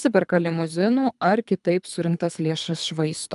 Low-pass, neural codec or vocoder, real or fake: 10.8 kHz; codec, 24 kHz, 3 kbps, HILCodec; fake